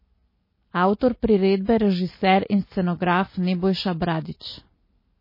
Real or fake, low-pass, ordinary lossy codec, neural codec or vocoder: real; 5.4 kHz; MP3, 24 kbps; none